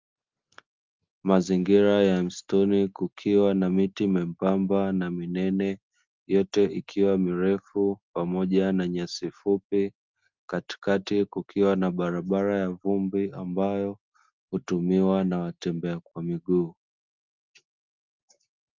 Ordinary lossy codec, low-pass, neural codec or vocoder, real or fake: Opus, 32 kbps; 7.2 kHz; none; real